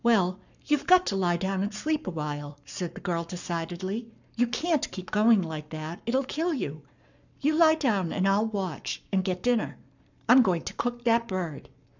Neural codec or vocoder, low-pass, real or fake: codec, 44.1 kHz, 7.8 kbps, Pupu-Codec; 7.2 kHz; fake